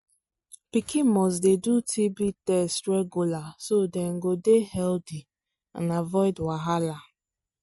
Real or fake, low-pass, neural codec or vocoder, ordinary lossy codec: real; 19.8 kHz; none; MP3, 48 kbps